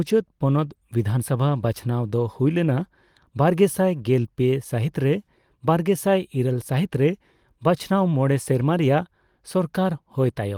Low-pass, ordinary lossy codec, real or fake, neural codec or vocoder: 19.8 kHz; Opus, 24 kbps; real; none